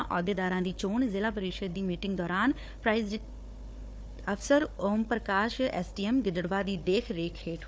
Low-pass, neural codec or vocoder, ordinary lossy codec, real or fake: none; codec, 16 kHz, 4 kbps, FunCodec, trained on Chinese and English, 50 frames a second; none; fake